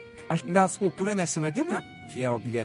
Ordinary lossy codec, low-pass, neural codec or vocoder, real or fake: MP3, 48 kbps; 10.8 kHz; codec, 24 kHz, 0.9 kbps, WavTokenizer, medium music audio release; fake